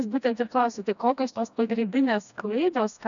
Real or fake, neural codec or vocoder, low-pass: fake; codec, 16 kHz, 1 kbps, FreqCodec, smaller model; 7.2 kHz